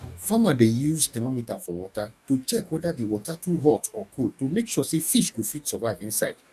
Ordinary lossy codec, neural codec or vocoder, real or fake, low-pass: none; codec, 44.1 kHz, 2.6 kbps, DAC; fake; 14.4 kHz